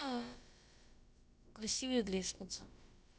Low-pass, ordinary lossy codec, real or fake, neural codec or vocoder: none; none; fake; codec, 16 kHz, about 1 kbps, DyCAST, with the encoder's durations